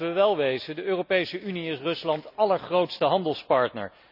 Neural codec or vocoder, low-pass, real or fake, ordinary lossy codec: none; 5.4 kHz; real; none